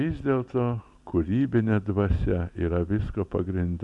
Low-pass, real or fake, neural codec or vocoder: 10.8 kHz; real; none